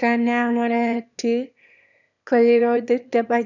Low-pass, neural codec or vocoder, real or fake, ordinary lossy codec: 7.2 kHz; autoencoder, 22.05 kHz, a latent of 192 numbers a frame, VITS, trained on one speaker; fake; none